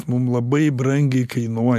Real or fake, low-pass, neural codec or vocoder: real; 14.4 kHz; none